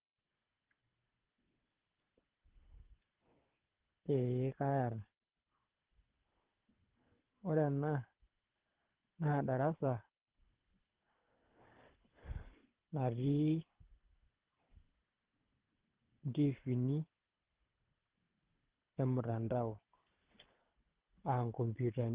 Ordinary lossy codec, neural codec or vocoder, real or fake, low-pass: Opus, 16 kbps; codec, 24 kHz, 6 kbps, HILCodec; fake; 3.6 kHz